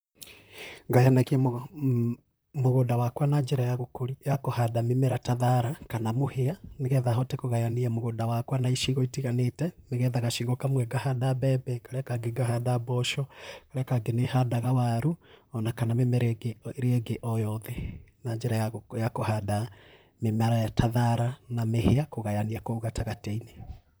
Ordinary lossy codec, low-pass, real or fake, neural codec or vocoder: none; none; fake; vocoder, 44.1 kHz, 128 mel bands, Pupu-Vocoder